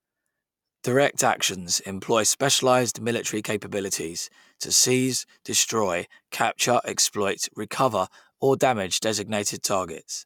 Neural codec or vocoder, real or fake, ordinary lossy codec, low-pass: none; real; none; 19.8 kHz